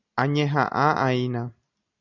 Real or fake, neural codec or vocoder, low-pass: real; none; 7.2 kHz